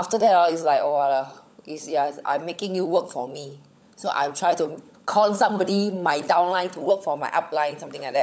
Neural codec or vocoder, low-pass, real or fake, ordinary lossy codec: codec, 16 kHz, 16 kbps, FunCodec, trained on LibriTTS, 50 frames a second; none; fake; none